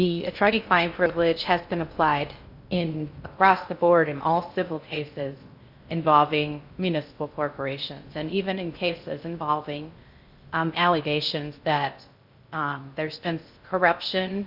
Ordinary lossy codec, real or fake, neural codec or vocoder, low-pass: Opus, 64 kbps; fake; codec, 16 kHz in and 24 kHz out, 0.6 kbps, FocalCodec, streaming, 4096 codes; 5.4 kHz